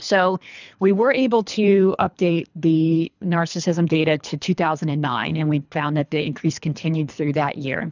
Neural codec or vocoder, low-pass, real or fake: codec, 24 kHz, 3 kbps, HILCodec; 7.2 kHz; fake